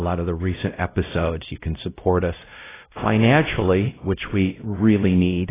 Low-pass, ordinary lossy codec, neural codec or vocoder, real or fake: 3.6 kHz; AAC, 16 kbps; codec, 16 kHz, 0.5 kbps, X-Codec, WavLM features, trained on Multilingual LibriSpeech; fake